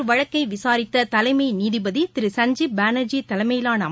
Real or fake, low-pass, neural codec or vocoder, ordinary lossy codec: real; none; none; none